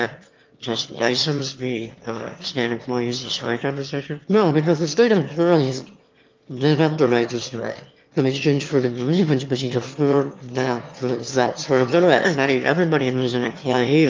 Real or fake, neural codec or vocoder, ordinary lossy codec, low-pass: fake; autoencoder, 22.05 kHz, a latent of 192 numbers a frame, VITS, trained on one speaker; Opus, 24 kbps; 7.2 kHz